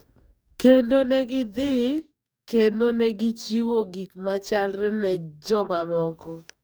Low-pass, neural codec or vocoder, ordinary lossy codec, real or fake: none; codec, 44.1 kHz, 2.6 kbps, DAC; none; fake